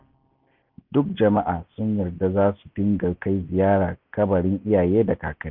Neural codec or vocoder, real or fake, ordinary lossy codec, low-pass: none; real; none; 5.4 kHz